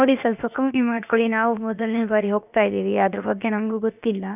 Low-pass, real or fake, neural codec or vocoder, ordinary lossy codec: 3.6 kHz; fake; codec, 16 kHz, about 1 kbps, DyCAST, with the encoder's durations; none